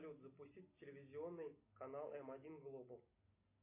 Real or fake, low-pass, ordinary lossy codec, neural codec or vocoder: real; 3.6 kHz; MP3, 24 kbps; none